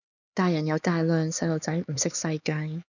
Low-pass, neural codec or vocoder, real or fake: 7.2 kHz; codec, 16 kHz, 4 kbps, X-Codec, HuBERT features, trained on LibriSpeech; fake